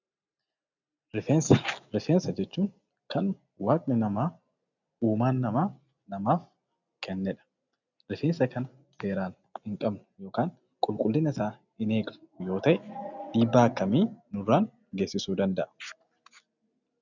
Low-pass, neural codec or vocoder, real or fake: 7.2 kHz; none; real